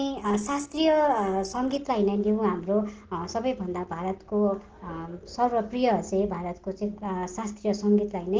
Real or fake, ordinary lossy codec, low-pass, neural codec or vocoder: real; Opus, 16 kbps; 7.2 kHz; none